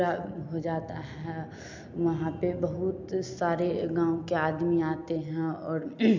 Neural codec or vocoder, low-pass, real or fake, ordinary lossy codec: none; 7.2 kHz; real; MP3, 64 kbps